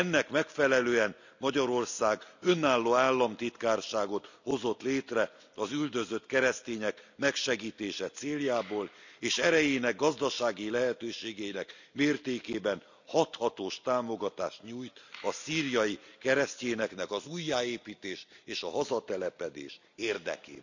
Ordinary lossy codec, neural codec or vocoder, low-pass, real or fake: none; none; 7.2 kHz; real